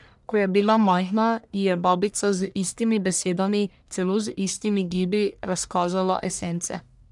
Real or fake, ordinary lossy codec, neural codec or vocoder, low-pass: fake; none; codec, 44.1 kHz, 1.7 kbps, Pupu-Codec; 10.8 kHz